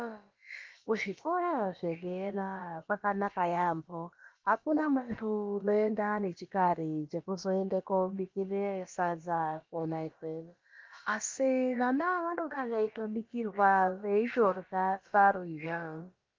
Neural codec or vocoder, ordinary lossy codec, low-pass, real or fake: codec, 16 kHz, about 1 kbps, DyCAST, with the encoder's durations; Opus, 32 kbps; 7.2 kHz; fake